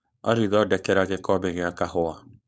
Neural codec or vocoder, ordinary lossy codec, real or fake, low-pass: codec, 16 kHz, 4.8 kbps, FACodec; none; fake; none